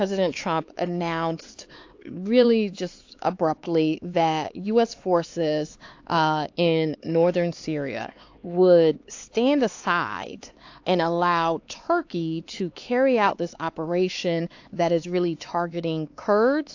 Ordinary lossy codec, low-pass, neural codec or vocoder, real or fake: AAC, 48 kbps; 7.2 kHz; codec, 16 kHz, 4 kbps, X-Codec, HuBERT features, trained on LibriSpeech; fake